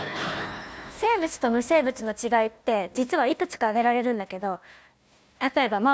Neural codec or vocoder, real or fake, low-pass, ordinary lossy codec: codec, 16 kHz, 1 kbps, FunCodec, trained on Chinese and English, 50 frames a second; fake; none; none